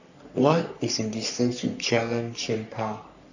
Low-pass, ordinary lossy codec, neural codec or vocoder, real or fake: 7.2 kHz; none; codec, 44.1 kHz, 3.4 kbps, Pupu-Codec; fake